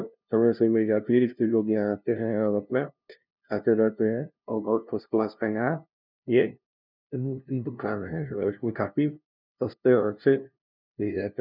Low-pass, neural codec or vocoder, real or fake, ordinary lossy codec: 5.4 kHz; codec, 16 kHz, 0.5 kbps, FunCodec, trained on LibriTTS, 25 frames a second; fake; none